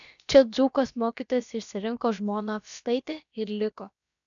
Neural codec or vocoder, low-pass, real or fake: codec, 16 kHz, about 1 kbps, DyCAST, with the encoder's durations; 7.2 kHz; fake